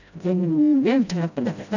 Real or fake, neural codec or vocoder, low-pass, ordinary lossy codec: fake; codec, 16 kHz, 0.5 kbps, FreqCodec, smaller model; 7.2 kHz; none